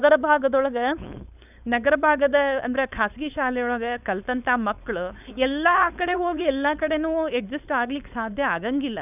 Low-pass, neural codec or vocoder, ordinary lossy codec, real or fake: 3.6 kHz; codec, 16 kHz, 4.8 kbps, FACodec; none; fake